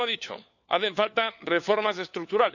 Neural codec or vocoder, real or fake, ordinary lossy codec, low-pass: codec, 16 kHz, 4 kbps, FunCodec, trained on LibriTTS, 50 frames a second; fake; none; 7.2 kHz